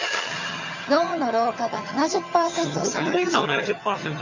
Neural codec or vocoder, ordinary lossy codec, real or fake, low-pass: vocoder, 22.05 kHz, 80 mel bands, HiFi-GAN; Opus, 64 kbps; fake; 7.2 kHz